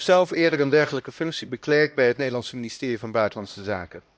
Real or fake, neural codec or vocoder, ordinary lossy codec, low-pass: fake; codec, 16 kHz, 1 kbps, X-Codec, HuBERT features, trained on LibriSpeech; none; none